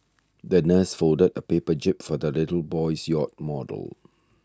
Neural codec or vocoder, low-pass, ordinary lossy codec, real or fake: none; none; none; real